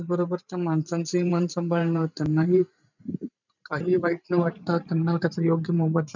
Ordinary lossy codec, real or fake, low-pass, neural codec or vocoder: none; fake; 7.2 kHz; vocoder, 44.1 kHz, 128 mel bands every 512 samples, BigVGAN v2